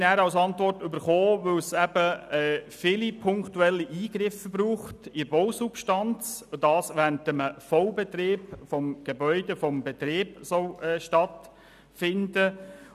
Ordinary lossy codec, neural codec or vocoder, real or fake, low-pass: none; none; real; 14.4 kHz